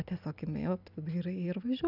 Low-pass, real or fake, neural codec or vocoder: 5.4 kHz; real; none